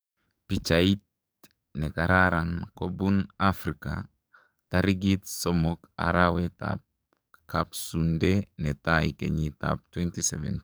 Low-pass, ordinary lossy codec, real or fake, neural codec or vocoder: none; none; fake; codec, 44.1 kHz, 7.8 kbps, DAC